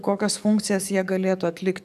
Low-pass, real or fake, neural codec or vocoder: 14.4 kHz; fake; codec, 44.1 kHz, 7.8 kbps, DAC